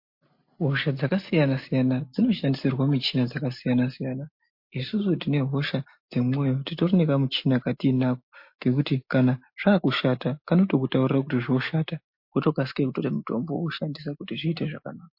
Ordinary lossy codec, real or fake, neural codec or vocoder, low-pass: MP3, 32 kbps; real; none; 5.4 kHz